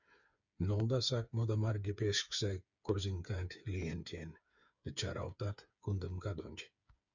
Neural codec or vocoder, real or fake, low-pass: codec, 16 kHz, 8 kbps, FreqCodec, smaller model; fake; 7.2 kHz